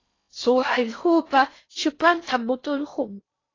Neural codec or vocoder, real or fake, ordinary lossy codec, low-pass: codec, 16 kHz in and 24 kHz out, 0.6 kbps, FocalCodec, streaming, 4096 codes; fake; AAC, 32 kbps; 7.2 kHz